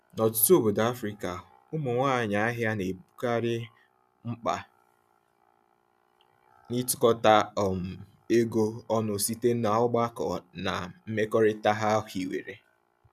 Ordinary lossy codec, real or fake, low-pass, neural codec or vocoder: none; real; 14.4 kHz; none